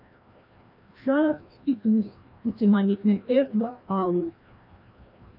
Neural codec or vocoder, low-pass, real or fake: codec, 16 kHz, 1 kbps, FreqCodec, larger model; 5.4 kHz; fake